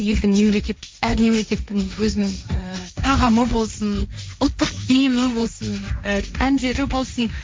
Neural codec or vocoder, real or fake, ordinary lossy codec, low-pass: codec, 16 kHz, 1.1 kbps, Voila-Tokenizer; fake; none; none